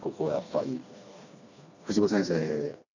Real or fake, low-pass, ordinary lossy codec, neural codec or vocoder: fake; 7.2 kHz; none; codec, 16 kHz, 2 kbps, FreqCodec, smaller model